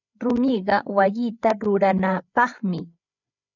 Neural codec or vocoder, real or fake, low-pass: codec, 16 kHz, 8 kbps, FreqCodec, larger model; fake; 7.2 kHz